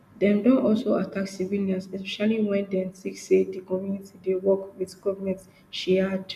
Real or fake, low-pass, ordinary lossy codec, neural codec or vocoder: real; 14.4 kHz; none; none